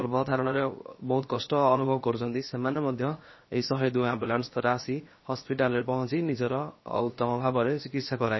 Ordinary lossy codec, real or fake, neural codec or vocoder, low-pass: MP3, 24 kbps; fake; codec, 16 kHz, 0.7 kbps, FocalCodec; 7.2 kHz